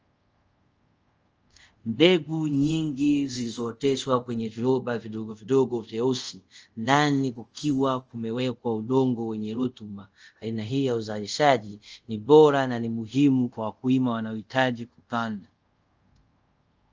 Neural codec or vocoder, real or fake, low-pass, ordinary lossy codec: codec, 24 kHz, 0.5 kbps, DualCodec; fake; 7.2 kHz; Opus, 24 kbps